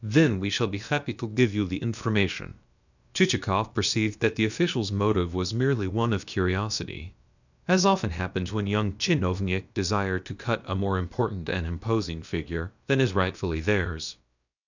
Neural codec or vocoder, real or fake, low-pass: codec, 16 kHz, about 1 kbps, DyCAST, with the encoder's durations; fake; 7.2 kHz